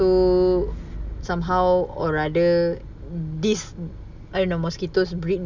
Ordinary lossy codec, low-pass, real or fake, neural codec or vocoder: none; 7.2 kHz; real; none